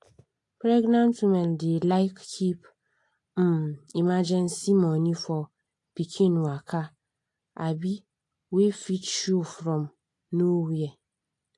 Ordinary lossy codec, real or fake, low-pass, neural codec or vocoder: AAC, 48 kbps; real; 10.8 kHz; none